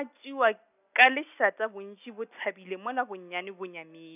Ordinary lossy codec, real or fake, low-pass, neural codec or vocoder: none; real; 3.6 kHz; none